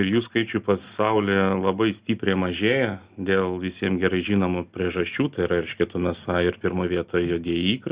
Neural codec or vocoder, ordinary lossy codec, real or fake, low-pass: none; Opus, 64 kbps; real; 3.6 kHz